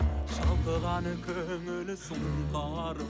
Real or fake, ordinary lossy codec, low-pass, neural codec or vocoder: real; none; none; none